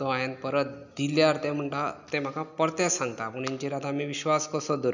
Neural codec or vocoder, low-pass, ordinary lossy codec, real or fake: none; 7.2 kHz; none; real